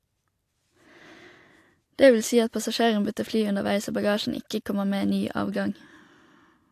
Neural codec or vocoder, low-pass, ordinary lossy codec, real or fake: none; 14.4 kHz; AAC, 64 kbps; real